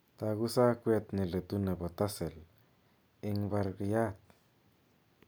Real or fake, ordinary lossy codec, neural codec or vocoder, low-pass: real; none; none; none